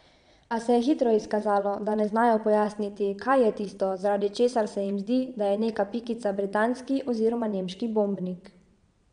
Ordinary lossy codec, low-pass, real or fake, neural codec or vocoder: none; 9.9 kHz; fake; vocoder, 22.05 kHz, 80 mel bands, Vocos